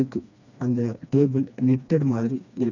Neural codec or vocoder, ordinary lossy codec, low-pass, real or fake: codec, 16 kHz, 2 kbps, FreqCodec, smaller model; none; 7.2 kHz; fake